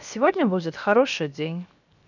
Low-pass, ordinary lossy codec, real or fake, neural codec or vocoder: 7.2 kHz; none; fake; codec, 16 kHz, 0.7 kbps, FocalCodec